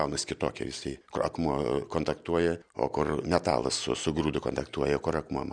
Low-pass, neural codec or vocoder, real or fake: 9.9 kHz; none; real